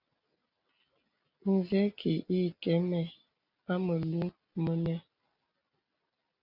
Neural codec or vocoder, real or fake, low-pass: none; real; 5.4 kHz